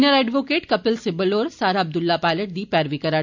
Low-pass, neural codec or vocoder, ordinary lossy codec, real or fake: 7.2 kHz; none; MP3, 64 kbps; real